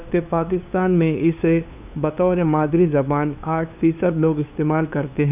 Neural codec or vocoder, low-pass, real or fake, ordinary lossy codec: codec, 16 kHz, 2 kbps, FunCodec, trained on LibriTTS, 25 frames a second; 3.6 kHz; fake; none